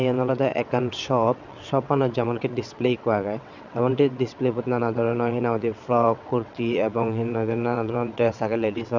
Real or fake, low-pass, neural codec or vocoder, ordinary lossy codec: fake; 7.2 kHz; vocoder, 22.05 kHz, 80 mel bands, WaveNeXt; none